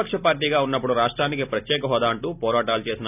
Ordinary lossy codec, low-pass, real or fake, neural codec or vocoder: none; 3.6 kHz; real; none